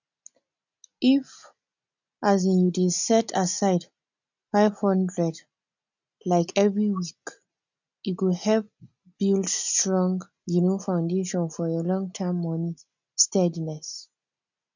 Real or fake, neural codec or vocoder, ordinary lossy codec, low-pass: real; none; none; 7.2 kHz